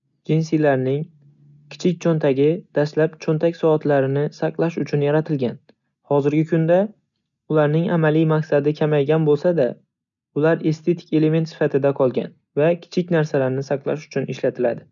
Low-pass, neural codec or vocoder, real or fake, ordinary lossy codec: 7.2 kHz; none; real; none